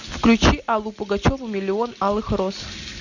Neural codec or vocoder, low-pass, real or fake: none; 7.2 kHz; real